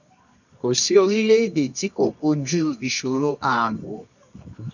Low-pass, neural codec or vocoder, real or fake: 7.2 kHz; codec, 24 kHz, 0.9 kbps, WavTokenizer, medium music audio release; fake